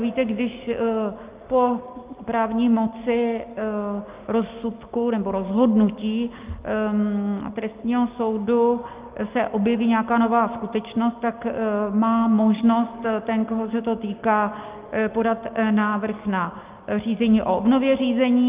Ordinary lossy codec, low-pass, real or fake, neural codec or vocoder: Opus, 24 kbps; 3.6 kHz; real; none